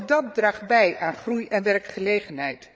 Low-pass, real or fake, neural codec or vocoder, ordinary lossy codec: none; fake; codec, 16 kHz, 8 kbps, FreqCodec, larger model; none